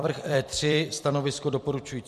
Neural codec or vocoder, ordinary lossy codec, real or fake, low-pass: vocoder, 44.1 kHz, 128 mel bands every 256 samples, BigVGAN v2; MP3, 64 kbps; fake; 14.4 kHz